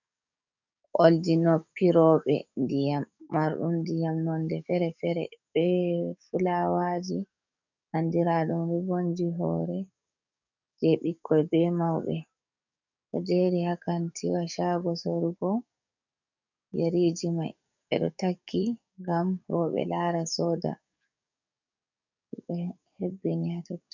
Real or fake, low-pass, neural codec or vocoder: fake; 7.2 kHz; codec, 44.1 kHz, 7.8 kbps, DAC